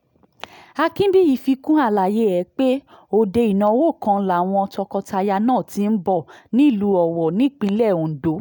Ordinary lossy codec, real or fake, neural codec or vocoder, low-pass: none; real; none; 19.8 kHz